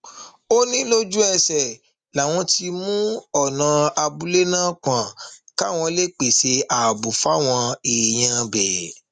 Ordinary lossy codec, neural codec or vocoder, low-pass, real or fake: Opus, 64 kbps; none; 9.9 kHz; real